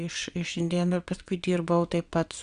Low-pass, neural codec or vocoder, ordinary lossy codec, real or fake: 9.9 kHz; vocoder, 22.05 kHz, 80 mel bands, WaveNeXt; Opus, 64 kbps; fake